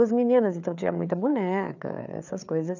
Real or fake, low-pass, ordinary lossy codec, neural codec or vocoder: fake; 7.2 kHz; none; codec, 16 kHz, 4 kbps, FreqCodec, larger model